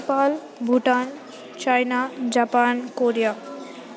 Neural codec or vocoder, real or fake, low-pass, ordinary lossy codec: none; real; none; none